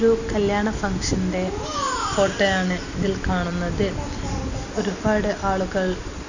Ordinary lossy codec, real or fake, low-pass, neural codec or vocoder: none; real; 7.2 kHz; none